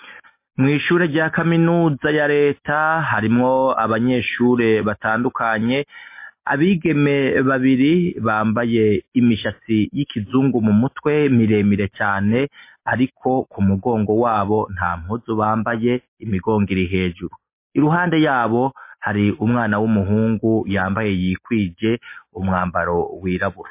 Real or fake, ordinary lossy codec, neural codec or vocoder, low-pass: real; MP3, 24 kbps; none; 3.6 kHz